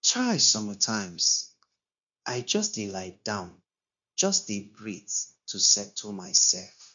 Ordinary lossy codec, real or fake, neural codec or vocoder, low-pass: MP3, 64 kbps; fake; codec, 16 kHz, 0.9 kbps, LongCat-Audio-Codec; 7.2 kHz